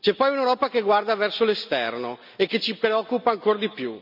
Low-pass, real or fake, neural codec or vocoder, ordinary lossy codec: 5.4 kHz; real; none; none